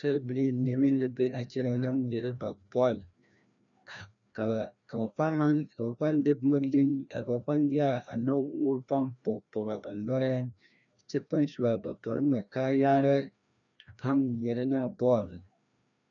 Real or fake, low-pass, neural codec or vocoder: fake; 7.2 kHz; codec, 16 kHz, 1 kbps, FreqCodec, larger model